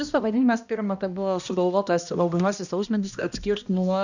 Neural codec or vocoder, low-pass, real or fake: codec, 16 kHz, 1 kbps, X-Codec, HuBERT features, trained on balanced general audio; 7.2 kHz; fake